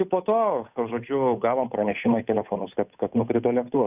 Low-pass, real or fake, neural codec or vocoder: 3.6 kHz; fake; codec, 16 kHz, 8 kbps, FunCodec, trained on Chinese and English, 25 frames a second